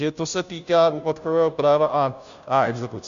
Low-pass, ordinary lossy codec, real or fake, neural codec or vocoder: 7.2 kHz; Opus, 64 kbps; fake; codec, 16 kHz, 0.5 kbps, FunCodec, trained on Chinese and English, 25 frames a second